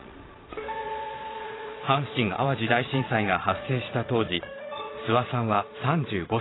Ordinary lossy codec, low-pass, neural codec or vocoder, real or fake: AAC, 16 kbps; 7.2 kHz; vocoder, 22.05 kHz, 80 mel bands, Vocos; fake